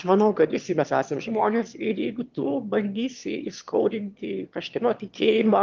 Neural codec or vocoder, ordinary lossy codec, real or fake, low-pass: autoencoder, 22.05 kHz, a latent of 192 numbers a frame, VITS, trained on one speaker; Opus, 32 kbps; fake; 7.2 kHz